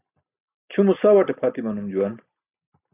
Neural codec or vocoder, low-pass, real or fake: none; 3.6 kHz; real